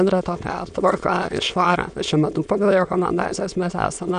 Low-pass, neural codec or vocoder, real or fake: 9.9 kHz; autoencoder, 22.05 kHz, a latent of 192 numbers a frame, VITS, trained on many speakers; fake